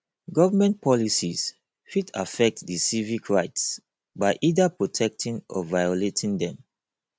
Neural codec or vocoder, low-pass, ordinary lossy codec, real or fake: none; none; none; real